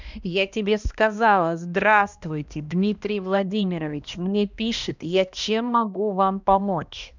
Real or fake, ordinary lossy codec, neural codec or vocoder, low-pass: fake; none; codec, 16 kHz, 1 kbps, X-Codec, HuBERT features, trained on balanced general audio; 7.2 kHz